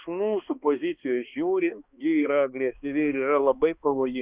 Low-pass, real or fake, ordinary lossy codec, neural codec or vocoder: 3.6 kHz; fake; Opus, 64 kbps; codec, 16 kHz, 2 kbps, X-Codec, HuBERT features, trained on balanced general audio